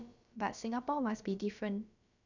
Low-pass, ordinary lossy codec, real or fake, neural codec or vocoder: 7.2 kHz; none; fake; codec, 16 kHz, about 1 kbps, DyCAST, with the encoder's durations